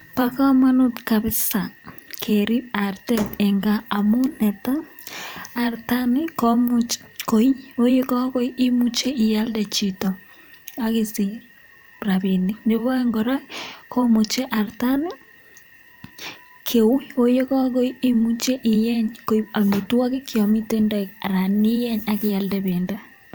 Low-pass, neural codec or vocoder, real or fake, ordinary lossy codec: none; vocoder, 44.1 kHz, 128 mel bands every 512 samples, BigVGAN v2; fake; none